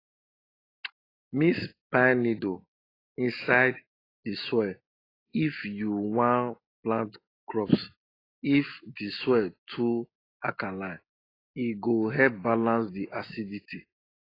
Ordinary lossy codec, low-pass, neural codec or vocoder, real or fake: AAC, 24 kbps; 5.4 kHz; none; real